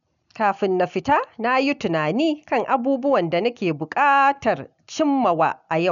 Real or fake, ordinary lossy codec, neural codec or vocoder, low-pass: real; none; none; 7.2 kHz